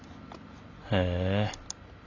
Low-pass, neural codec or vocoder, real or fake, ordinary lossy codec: 7.2 kHz; none; real; AAC, 48 kbps